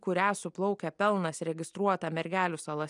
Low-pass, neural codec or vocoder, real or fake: 10.8 kHz; none; real